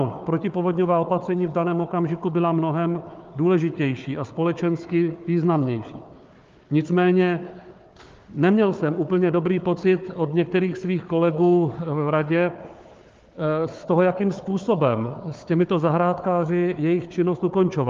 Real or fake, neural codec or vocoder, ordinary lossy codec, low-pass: fake; codec, 16 kHz, 4 kbps, FunCodec, trained on Chinese and English, 50 frames a second; Opus, 32 kbps; 7.2 kHz